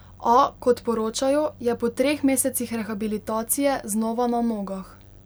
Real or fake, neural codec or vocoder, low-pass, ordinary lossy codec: real; none; none; none